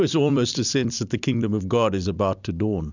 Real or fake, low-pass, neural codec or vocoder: fake; 7.2 kHz; vocoder, 44.1 kHz, 128 mel bands every 256 samples, BigVGAN v2